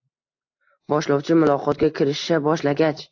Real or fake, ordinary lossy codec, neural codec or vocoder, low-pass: real; AAC, 48 kbps; none; 7.2 kHz